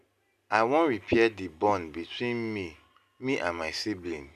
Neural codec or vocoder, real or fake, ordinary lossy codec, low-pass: none; real; none; 14.4 kHz